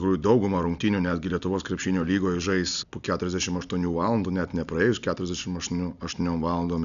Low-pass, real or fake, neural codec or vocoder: 7.2 kHz; real; none